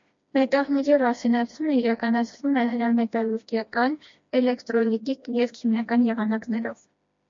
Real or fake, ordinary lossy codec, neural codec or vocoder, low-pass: fake; MP3, 48 kbps; codec, 16 kHz, 1 kbps, FreqCodec, smaller model; 7.2 kHz